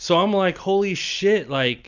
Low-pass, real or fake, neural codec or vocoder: 7.2 kHz; real; none